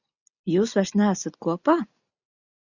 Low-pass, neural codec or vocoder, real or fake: 7.2 kHz; none; real